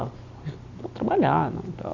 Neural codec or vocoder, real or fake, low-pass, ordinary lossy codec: none; real; 7.2 kHz; none